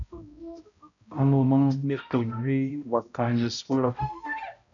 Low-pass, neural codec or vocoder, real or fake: 7.2 kHz; codec, 16 kHz, 0.5 kbps, X-Codec, HuBERT features, trained on balanced general audio; fake